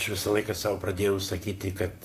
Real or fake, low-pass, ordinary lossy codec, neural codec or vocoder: fake; 14.4 kHz; AAC, 64 kbps; vocoder, 44.1 kHz, 128 mel bands, Pupu-Vocoder